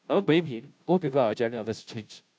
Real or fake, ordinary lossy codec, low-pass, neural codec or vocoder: fake; none; none; codec, 16 kHz, 0.5 kbps, FunCodec, trained on Chinese and English, 25 frames a second